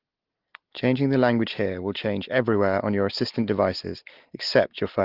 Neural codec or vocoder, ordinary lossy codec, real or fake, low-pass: none; Opus, 16 kbps; real; 5.4 kHz